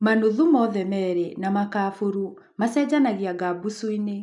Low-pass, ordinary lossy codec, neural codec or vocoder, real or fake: 10.8 kHz; none; none; real